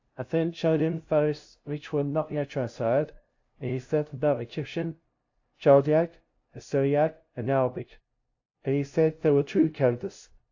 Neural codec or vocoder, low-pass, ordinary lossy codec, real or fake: codec, 16 kHz, 0.5 kbps, FunCodec, trained on LibriTTS, 25 frames a second; 7.2 kHz; AAC, 48 kbps; fake